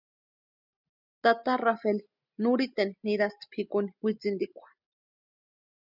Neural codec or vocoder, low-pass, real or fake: none; 5.4 kHz; real